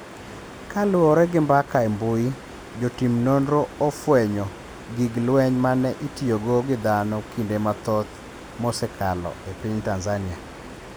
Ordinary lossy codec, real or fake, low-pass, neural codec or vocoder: none; real; none; none